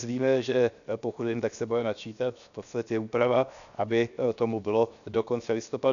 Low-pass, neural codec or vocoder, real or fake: 7.2 kHz; codec, 16 kHz, 0.7 kbps, FocalCodec; fake